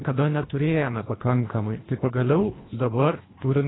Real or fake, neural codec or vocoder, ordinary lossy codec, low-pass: fake; codec, 24 kHz, 1.5 kbps, HILCodec; AAC, 16 kbps; 7.2 kHz